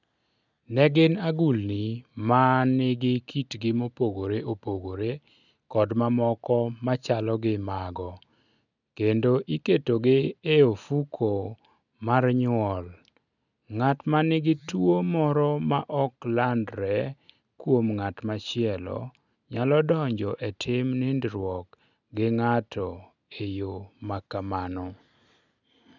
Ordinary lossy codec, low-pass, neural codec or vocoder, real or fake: none; 7.2 kHz; none; real